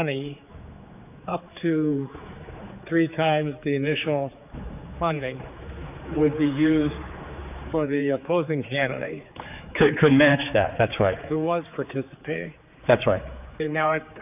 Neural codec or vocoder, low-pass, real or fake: codec, 16 kHz, 4 kbps, X-Codec, HuBERT features, trained on general audio; 3.6 kHz; fake